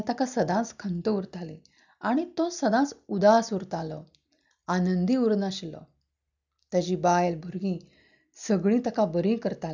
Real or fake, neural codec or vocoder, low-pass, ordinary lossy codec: real; none; 7.2 kHz; none